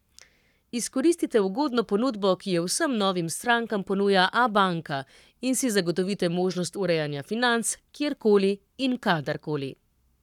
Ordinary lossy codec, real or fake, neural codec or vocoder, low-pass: none; fake; codec, 44.1 kHz, 7.8 kbps, Pupu-Codec; 19.8 kHz